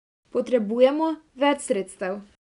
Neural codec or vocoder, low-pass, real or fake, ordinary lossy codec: none; 10.8 kHz; real; none